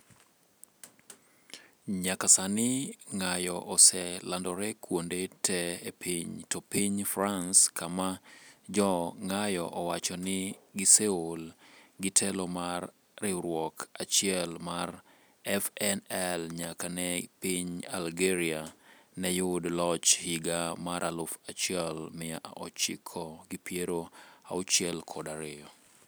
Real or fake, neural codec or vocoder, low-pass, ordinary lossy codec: real; none; none; none